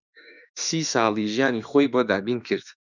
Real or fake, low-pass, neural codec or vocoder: fake; 7.2 kHz; autoencoder, 48 kHz, 32 numbers a frame, DAC-VAE, trained on Japanese speech